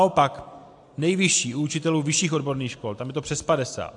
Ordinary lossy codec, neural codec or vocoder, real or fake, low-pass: AAC, 64 kbps; none; real; 10.8 kHz